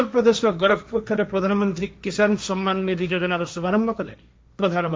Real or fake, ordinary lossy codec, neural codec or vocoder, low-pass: fake; none; codec, 16 kHz, 1.1 kbps, Voila-Tokenizer; none